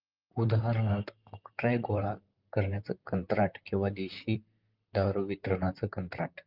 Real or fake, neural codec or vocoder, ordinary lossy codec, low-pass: fake; vocoder, 44.1 kHz, 128 mel bands, Pupu-Vocoder; Opus, 24 kbps; 5.4 kHz